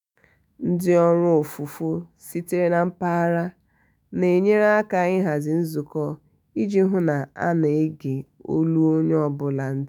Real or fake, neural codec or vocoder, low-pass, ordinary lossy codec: fake; autoencoder, 48 kHz, 128 numbers a frame, DAC-VAE, trained on Japanese speech; none; none